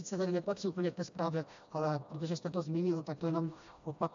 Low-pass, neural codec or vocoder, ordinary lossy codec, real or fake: 7.2 kHz; codec, 16 kHz, 1 kbps, FreqCodec, smaller model; AAC, 64 kbps; fake